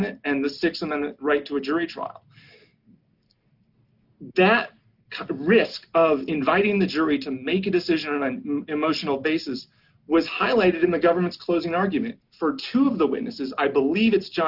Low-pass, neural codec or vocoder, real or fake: 5.4 kHz; none; real